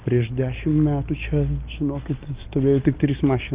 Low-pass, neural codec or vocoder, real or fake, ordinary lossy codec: 3.6 kHz; none; real; Opus, 32 kbps